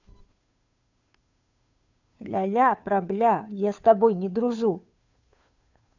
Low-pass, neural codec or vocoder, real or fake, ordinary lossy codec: 7.2 kHz; codec, 16 kHz, 2 kbps, FunCodec, trained on Chinese and English, 25 frames a second; fake; none